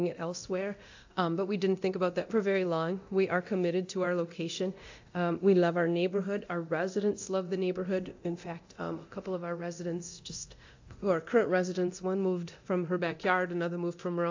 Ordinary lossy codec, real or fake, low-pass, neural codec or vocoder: AAC, 48 kbps; fake; 7.2 kHz; codec, 24 kHz, 0.9 kbps, DualCodec